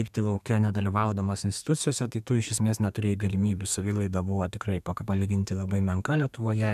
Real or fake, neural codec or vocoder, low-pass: fake; codec, 32 kHz, 1.9 kbps, SNAC; 14.4 kHz